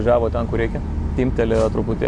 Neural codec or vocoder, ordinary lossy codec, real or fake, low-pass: none; MP3, 64 kbps; real; 10.8 kHz